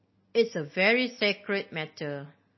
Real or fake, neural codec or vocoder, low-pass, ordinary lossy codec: real; none; 7.2 kHz; MP3, 24 kbps